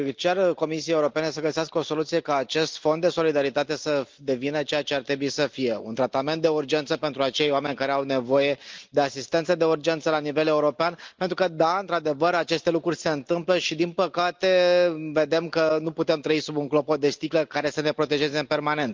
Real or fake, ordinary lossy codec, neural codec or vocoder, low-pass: real; Opus, 24 kbps; none; 7.2 kHz